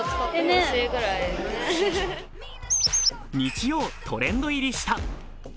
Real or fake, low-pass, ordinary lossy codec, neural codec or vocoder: real; none; none; none